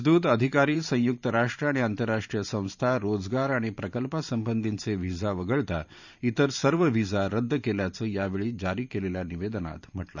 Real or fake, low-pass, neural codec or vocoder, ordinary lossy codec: fake; 7.2 kHz; vocoder, 44.1 kHz, 128 mel bands every 512 samples, BigVGAN v2; none